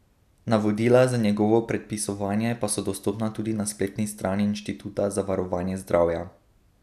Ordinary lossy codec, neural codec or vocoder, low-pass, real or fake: none; none; 14.4 kHz; real